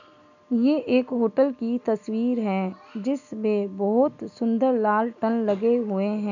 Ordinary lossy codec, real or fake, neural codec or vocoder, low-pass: none; real; none; 7.2 kHz